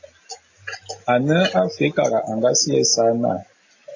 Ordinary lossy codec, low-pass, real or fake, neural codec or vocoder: AAC, 48 kbps; 7.2 kHz; real; none